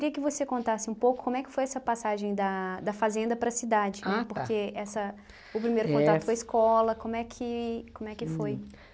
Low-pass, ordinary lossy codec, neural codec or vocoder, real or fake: none; none; none; real